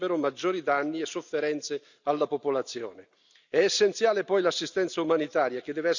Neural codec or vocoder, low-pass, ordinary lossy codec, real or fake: none; 7.2 kHz; none; real